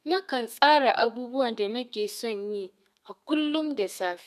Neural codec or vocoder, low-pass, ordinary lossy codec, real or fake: codec, 32 kHz, 1.9 kbps, SNAC; 14.4 kHz; none; fake